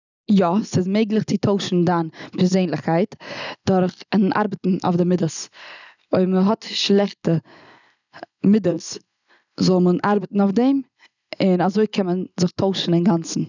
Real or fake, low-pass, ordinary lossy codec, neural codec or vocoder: real; 7.2 kHz; none; none